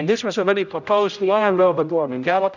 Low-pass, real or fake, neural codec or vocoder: 7.2 kHz; fake; codec, 16 kHz, 0.5 kbps, X-Codec, HuBERT features, trained on general audio